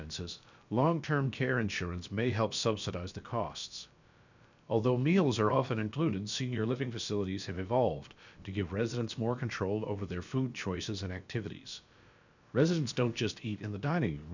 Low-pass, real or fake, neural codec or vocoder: 7.2 kHz; fake; codec, 16 kHz, about 1 kbps, DyCAST, with the encoder's durations